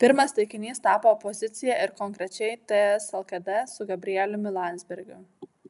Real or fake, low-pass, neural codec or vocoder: real; 10.8 kHz; none